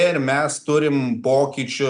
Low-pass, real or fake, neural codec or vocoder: 9.9 kHz; real; none